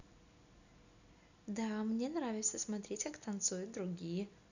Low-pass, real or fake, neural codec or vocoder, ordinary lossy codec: 7.2 kHz; real; none; none